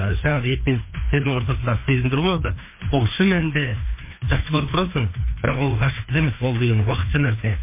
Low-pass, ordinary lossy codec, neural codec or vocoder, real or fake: 3.6 kHz; MP3, 24 kbps; codec, 16 kHz, 2 kbps, FreqCodec, larger model; fake